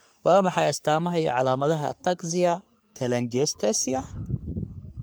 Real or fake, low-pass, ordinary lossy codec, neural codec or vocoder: fake; none; none; codec, 44.1 kHz, 3.4 kbps, Pupu-Codec